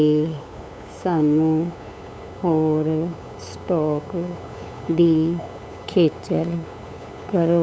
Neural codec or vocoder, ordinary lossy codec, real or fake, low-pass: codec, 16 kHz, 8 kbps, FunCodec, trained on LibriTTS, 25 frames a second; none; fake; none